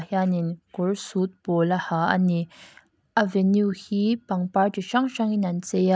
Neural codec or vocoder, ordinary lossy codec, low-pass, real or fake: none; none; none; real